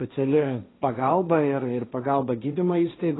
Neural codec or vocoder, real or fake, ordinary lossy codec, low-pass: codec, 16 kHz, 1.1 kbps, Voila-Tokenizer; fake; AAC, 16 kbps; 7.2 kHz